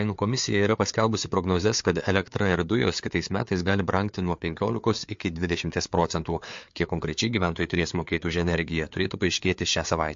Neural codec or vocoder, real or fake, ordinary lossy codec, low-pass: codec, 16 kHz, 4 kbps, FreqCodec, larger model; fake; MP3, 48 kbps; 7.2 kHz